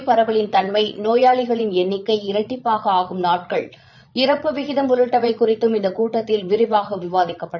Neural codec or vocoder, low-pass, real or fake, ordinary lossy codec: vocoder, 22.05 kHz, 80 mel bands, Vocos; 7.2 kHz; fake; none